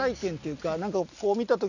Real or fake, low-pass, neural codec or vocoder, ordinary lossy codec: real; 7.2 kHz; none; none